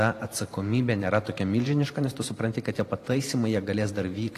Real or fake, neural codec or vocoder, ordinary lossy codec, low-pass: fake; vocoder, 44.1 kHz, 128 mel bands every 512 samples, BigVGAN v2; AAC, 48 kbps; 14.4 kHz